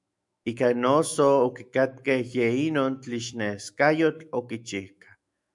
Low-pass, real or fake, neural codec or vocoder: 10.8 kHz; fake; autoencoder, 48 kHz, 128 numbers a frame, DAC-VAE, trained on Japanese speech